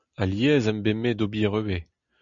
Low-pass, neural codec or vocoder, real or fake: 7.2 kHz; none; real